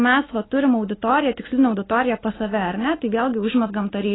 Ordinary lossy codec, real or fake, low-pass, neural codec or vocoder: AAC, 16 kbps; real; 7.2 kHz; none